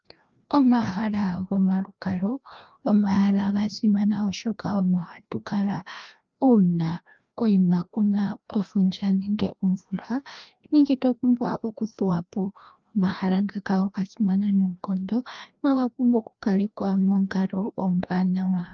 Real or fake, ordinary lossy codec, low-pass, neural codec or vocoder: fake; Opus, 24 kbps; 7.2 kHz; codec, 16 kHz, 1 kbps, FreqCodec, larger model